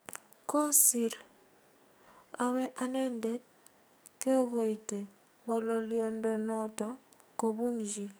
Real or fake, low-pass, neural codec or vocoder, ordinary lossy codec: fake; none; codec, 44.1 kHz, 2.6 kbps, SNAC; none